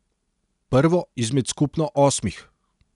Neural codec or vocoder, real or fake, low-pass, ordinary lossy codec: none; real; 10.8 kHz; none